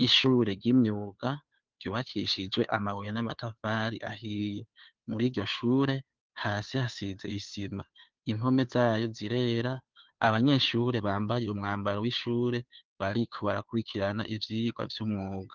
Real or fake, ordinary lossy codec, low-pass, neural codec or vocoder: fake; Opus, 32 kbps; 7.2 kHz; codec, 16 kHz, 2 kbps, FunCodec, trained on Chinese and English, 25 frames a second